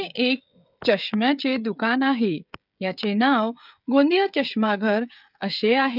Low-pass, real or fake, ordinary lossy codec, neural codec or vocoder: 5.4 kHz; fake; none; codec, 16 kHz, 16 kbps, FreqCodec, smaller model